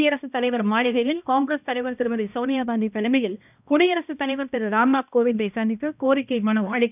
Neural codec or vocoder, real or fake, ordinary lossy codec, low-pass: codec, 16 kHz, 1 kbps, X-Codec, HuBERT features, trained on balanced general audio; fake; none; 3.6 kHz